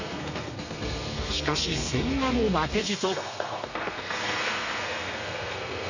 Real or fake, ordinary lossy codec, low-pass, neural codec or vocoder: fake; none; 7.2 kHz; codec, 32 kHz, 1.9 kbps, SNAC